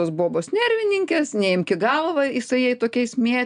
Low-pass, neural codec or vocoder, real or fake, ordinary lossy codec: 9.9 kHz; none; real; MP3, 96 kbps